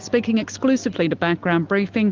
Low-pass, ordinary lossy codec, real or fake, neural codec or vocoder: 7.2 kHz; Opus, 32 kbps; real; none